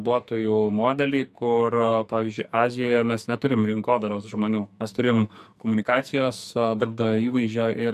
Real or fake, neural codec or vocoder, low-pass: fake; codec, 44.1 kHz, 2.6 kbps, SNAC; 14.4 kHz